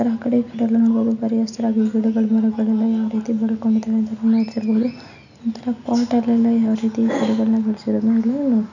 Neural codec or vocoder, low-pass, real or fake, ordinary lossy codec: none; 7.2 kHz; real; none